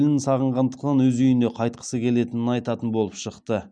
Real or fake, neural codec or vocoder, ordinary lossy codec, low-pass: real; none; none; 9.9 kHz